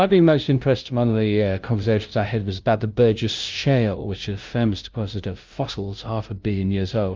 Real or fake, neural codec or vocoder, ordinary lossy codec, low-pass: fake; codec, 16 kHz, 0.5 kbps, FunCodec, trained on LibriTTS, 25 frames a second; Opus, 24 kbps; 7.2 kHz